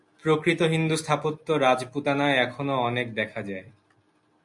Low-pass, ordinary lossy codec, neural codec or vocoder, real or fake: 10.8 kHz; MP3, 64 kbps; none; real